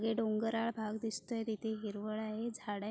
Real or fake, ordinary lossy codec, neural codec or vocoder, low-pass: real; none; none; none